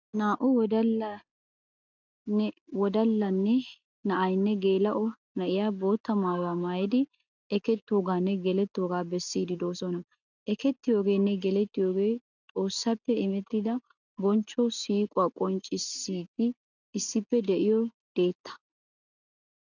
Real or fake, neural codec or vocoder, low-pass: real; none; 7.2 kHz